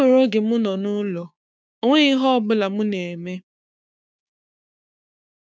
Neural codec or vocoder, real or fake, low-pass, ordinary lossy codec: codec, 16 kHz, 6 kbps, DAC; fake; none; none